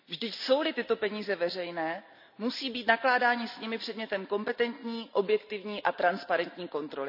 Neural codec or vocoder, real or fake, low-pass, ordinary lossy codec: none; real; 5.4 kHz; none